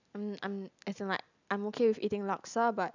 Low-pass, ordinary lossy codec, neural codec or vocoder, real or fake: 7.2 kHz; none; none; real